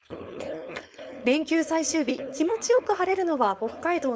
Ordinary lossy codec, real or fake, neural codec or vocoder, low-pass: none; fake; codec, 16 kHz, 4.8 kbps, FACodec; none